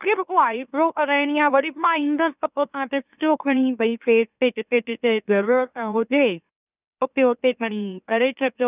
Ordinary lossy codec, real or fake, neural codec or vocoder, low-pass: none; fake; autoencoder, 44.1 kHz, a latent of 192 numbers a frame, MeloTTS; 3.6 kHz